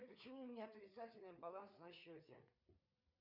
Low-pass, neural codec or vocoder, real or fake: 5.4 kHz; codec, 16 kHz, 4 kbps, FreqCodec, larger model; fake